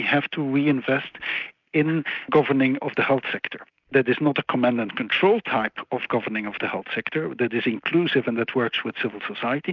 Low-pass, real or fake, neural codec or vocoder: 7.2 kHz; real; none